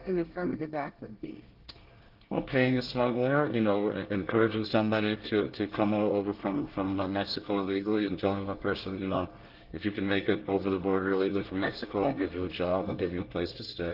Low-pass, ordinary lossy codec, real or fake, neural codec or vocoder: 5.4 kHz; Opus, 16 kbps; fake; codec, 24 kHz, 1 kbps, SNAC